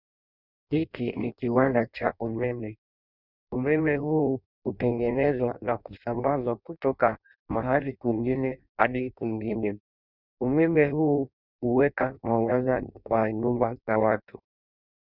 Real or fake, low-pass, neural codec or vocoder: fake; 5.4 kHz; codec, 16 kHz in and 24 kHz out, 0.6 kbps, FireRedTTS-2 codec